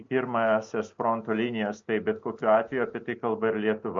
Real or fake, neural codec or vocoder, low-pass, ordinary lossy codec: real; none; 7.2 kHz; MP3, 48 kbps